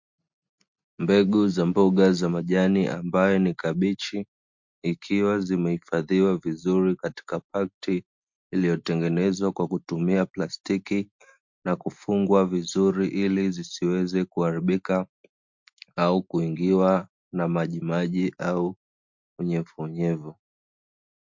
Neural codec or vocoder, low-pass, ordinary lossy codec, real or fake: none; 7.2 kHz; MP3, 48 kbps; real